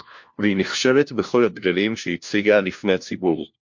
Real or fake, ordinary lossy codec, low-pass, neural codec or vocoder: fake; MP3, 48 kbps; 7.2 kHz; codec, 16 kHz, 1 kbps, FunCodec, trained on LibriTTS, 50 frames a second